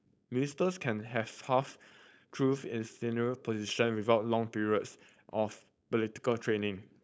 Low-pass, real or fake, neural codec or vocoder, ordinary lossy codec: none; fake; codec, 16 kHz, 4.8 kbps, FACodec; none